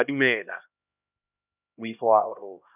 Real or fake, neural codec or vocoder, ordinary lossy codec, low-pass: fake; codec, 16 kHz, 1 kbps, X-Codec, HuBERT features, trained on LibriSpeech; none; 3.6 kHz